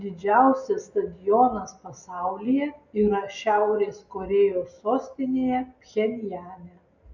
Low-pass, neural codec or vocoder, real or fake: 7.2 kHz; none; real